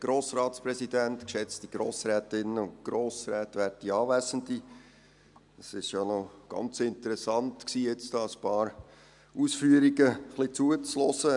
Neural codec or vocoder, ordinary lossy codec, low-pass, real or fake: none; none; 10.8 kHz; real